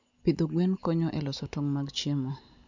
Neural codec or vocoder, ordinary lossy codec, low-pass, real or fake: none; none; 7.2 kHz; real